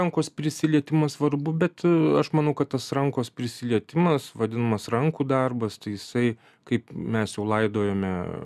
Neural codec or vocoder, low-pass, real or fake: none; 14.4 kHz; real